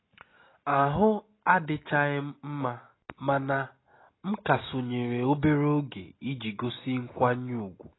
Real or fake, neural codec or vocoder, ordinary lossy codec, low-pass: real; none; AAC, 16 kbps; 7.2 kHz